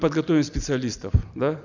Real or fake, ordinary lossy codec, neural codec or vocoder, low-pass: real; none; none; 7.2 kHz